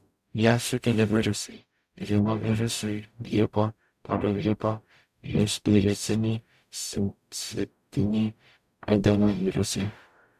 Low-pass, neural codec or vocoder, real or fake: 14.4 kHz; codec, 44.1 kHz, 0.9 kbps, DAC; fake